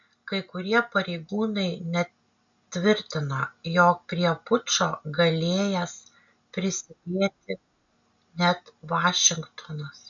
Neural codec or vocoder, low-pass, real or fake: none; 7.2 kHz; real